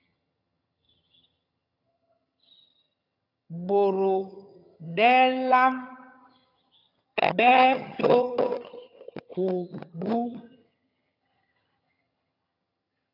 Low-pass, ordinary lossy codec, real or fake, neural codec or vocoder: 5.4 kHz; AAC, 32 kbps; fake; vocoder, 22.05 kHz, 80 mel bands, HiFi-GAN